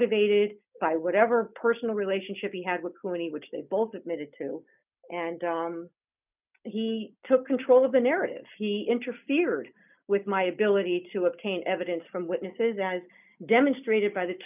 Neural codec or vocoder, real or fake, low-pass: none; real; 3.6 kHz